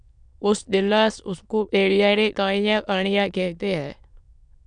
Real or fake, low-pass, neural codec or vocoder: fake; 9.9 kHz; autoencoder, 22.05 kHz, a latent of 192 numbers a frame, VITS, trained on many speakers